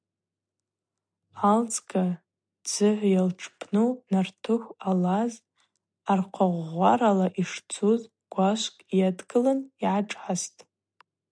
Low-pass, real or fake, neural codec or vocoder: 9.9 kHz; real; none